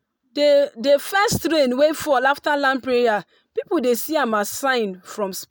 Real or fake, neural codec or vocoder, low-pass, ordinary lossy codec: real; none; none; none